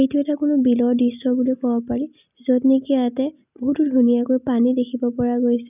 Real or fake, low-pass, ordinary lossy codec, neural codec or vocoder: real; 3.6 kHz; none; none